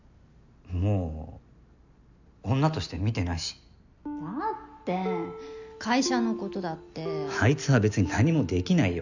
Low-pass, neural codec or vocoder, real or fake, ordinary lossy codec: 7.2 kHz; none; real; none